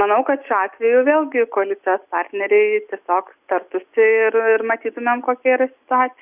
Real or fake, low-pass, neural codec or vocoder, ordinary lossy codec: real; 3.6 kHz; none; Opus, 64 kbps